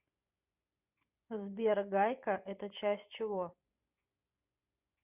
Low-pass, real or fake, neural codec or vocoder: 3.6 kHz; fake; vocoder, 24 kHz, 100 mel bands, Vocos